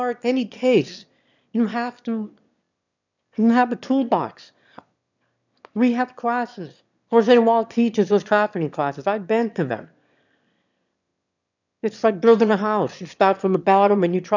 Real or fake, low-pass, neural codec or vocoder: fake; 7.2 kHz; autoencoder, 22.05 kHz, a latent of 192 numbers a frame, VITS, trained on one speaker